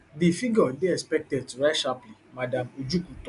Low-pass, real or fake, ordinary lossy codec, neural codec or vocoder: 10.8 kHz; real; none; none